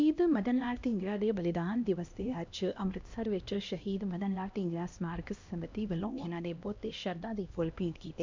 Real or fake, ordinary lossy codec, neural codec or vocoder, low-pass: fake; MP3, 64 kbps; codec, 16 kHz, 1 kbps, X-Codec, HuBERT features, trained on LibriSpeech; 7.2 kHz